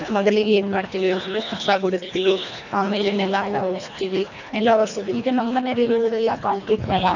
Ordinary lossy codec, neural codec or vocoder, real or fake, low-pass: none; codec, 24 kHz, 1.5 kbps, HILCodec; fake; 7.2 kHz